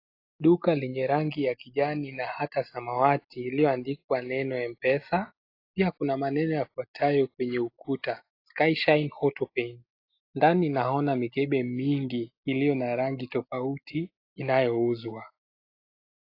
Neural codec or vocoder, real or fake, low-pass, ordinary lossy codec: none; real; 5.4 kHz; AAC, 32 kbps